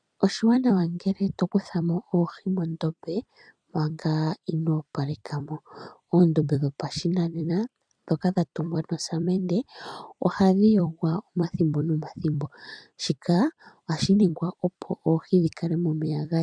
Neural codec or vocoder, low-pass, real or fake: vocoder, 44.1 kHz, 128 mel bands every 256 samples, BigVGAN v2; 9.9 kHz; fake